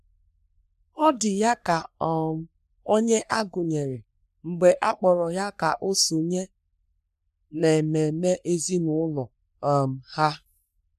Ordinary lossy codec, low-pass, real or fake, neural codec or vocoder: none; 14.4 kHz; fake; codec, 44.1 kHz, 3.4 kbps, Pupu-Codec